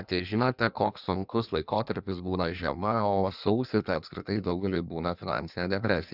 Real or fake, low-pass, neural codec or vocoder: fake; 5.4 kHz; codec, 16 kHz in and 24 kHz out, 1.1 kbps, FireRedTTS-2 codec